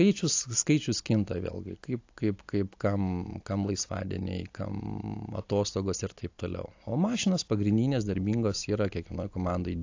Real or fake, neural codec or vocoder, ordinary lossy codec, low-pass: real; none; AAC, 48 kbps; 7.2 kHz